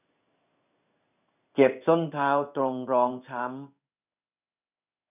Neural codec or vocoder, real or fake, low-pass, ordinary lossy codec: codec, 16 kHz in and 24 kHz out, 1 kbps, XY-Tokenizer; fake; 3.6 kHz; none